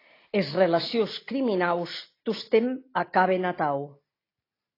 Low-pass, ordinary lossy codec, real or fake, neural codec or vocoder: 5.4 kHz; AAC, 24 kbps; real; none